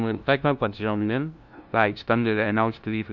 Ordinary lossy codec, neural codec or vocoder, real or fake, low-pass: none; codec, 16 kHz, 0.5 kbps, FunCodec, trained on LibriTTS, 25 frames a second; fake; 7.2 kHz